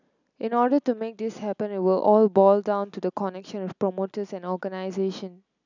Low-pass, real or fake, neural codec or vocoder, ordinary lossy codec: 7.2 kHz; real; none; none